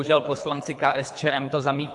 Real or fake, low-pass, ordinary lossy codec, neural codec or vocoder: fake; 10.8 kHz; AAC, 64 kbps; codec, 24 kHz, 3 kbps, HILCodec